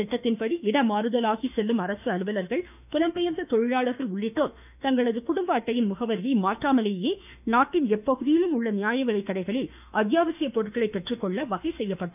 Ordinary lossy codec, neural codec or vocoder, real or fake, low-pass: none; autoencoder, 48 kHz, 32 numbers a frame, DAC-VAE, trained on Japanese speech; fake; 3.6 kHz